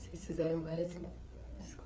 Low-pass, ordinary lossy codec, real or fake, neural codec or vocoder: none; none; fake; codec, 16 kHz, 4 kbps, FreqCodec, larger model